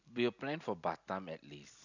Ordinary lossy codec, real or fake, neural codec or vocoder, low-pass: none; real; none; 7.2 kHz